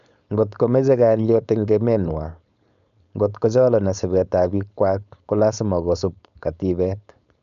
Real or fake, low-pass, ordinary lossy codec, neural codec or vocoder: fake; 7.2 kHz; none; codec, 16 kHz, 4.8 kbps, FACodec